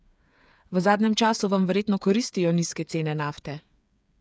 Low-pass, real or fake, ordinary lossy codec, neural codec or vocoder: none; fake; none; codec, 16 kHz, 8 kbps, FreqCodec, smaller model